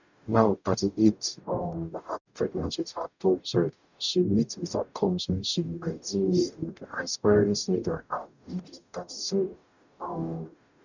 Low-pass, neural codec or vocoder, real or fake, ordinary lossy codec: 7.2 kHz; codec, 44.1 kHz, 0.9 kbps, DAC; fake; none